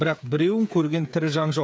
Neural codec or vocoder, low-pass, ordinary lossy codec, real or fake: codec, 16 kHz, 8 kbps, FreqCodec, smaller model; none; none; fake